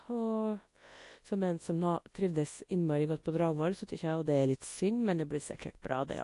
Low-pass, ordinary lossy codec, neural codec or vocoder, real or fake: 10.8 kHz; AAC, 48 kbps; codec, 24 kHz, 0.9 kbps, WavTokenizer, large speech release; fake